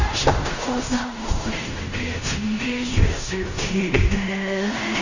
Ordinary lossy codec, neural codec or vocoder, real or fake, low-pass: none; codec, 16 kHz in and 24 kHz out, 0.4 kbps, LongCat-Audio-Codec, fine tuned four codebook decoder; fake; 7.2 kHz